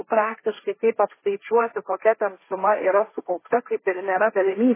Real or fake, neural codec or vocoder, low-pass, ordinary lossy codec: fake; codec, 16 kHz, 1.1 kbps, Voila-Tokenizer; 3.6 kHz; MP3, 16 kbps